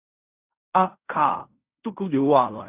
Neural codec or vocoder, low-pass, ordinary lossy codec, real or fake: codec, 16 kHz in and 24 kHz out, 0.4 kbps, LongCat-Audio-Codec, fine tuned four codebook decoder; 3.6 kHz; Opus, 64 kbps; fake